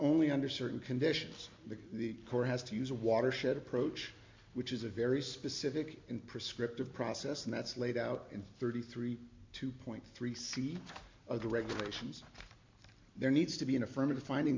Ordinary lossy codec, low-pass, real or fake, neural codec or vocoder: MP3, 48 kbps; 7.2 kHz; real; none